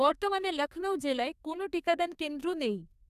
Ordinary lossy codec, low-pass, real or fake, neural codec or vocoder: none; 14.4 kHz; fake; codec, 32 kHz, 1.9 kbps, SNAC